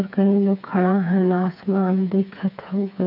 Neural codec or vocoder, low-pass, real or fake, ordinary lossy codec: codec, 16 kHz, 4 kbps, FreqCodec, smaller model; 5.4 kHz; fake; none